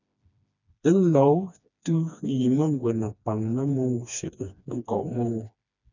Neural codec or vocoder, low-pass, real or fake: codec, 16 kHz, 2 kbps, FreqCodec, smaller model; 7.2 kHz; fake